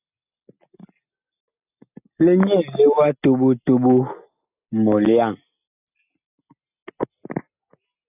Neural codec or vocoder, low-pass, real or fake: none; 3.6 kHz; real